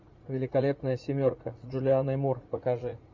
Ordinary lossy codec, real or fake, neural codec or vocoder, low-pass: MP3, 64 kbps; fake; vocoder, 44.1 kHz, 80 mel bands, Vocos; 7.2 kHz